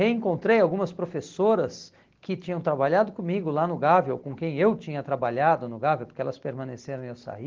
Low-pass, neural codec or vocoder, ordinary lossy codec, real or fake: 7.2 kHz; none; Opus, 16 kbps; real